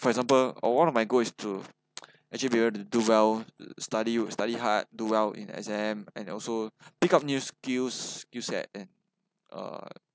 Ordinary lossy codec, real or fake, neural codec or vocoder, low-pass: none; real; none; none